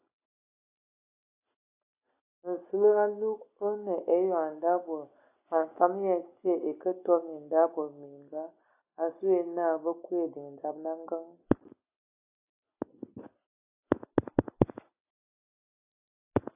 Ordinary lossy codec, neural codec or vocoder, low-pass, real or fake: AAC, 32 kbps; none; 3.6 kHz; real